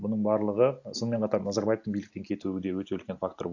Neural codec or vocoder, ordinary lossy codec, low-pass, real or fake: none; AAC, 48 kbps; 7.2 kHz; real